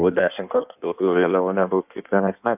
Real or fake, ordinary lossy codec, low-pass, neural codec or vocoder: fake; AAC, 32 kbps; 3.6 kHz; codec, 16 kHz in and 24 kHz out, 1.1 kbps, FireRedTTS-2 codec